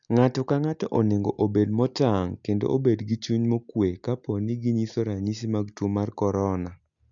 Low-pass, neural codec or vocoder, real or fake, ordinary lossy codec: 7.2 kHz; none; real; none